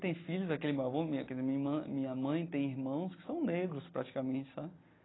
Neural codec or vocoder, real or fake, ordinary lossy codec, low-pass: none; real; AAC, 16 kbps; 7.2 kHz